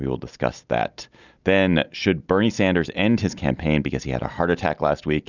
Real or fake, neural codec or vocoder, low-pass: real; none; 7.2 kHz